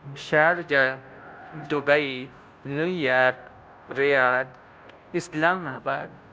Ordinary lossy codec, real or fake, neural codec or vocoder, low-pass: none; fake; codec, 16 kHz, 0.5 kbps, FunCodec, trained on Chinese and English, 25 frames a second; none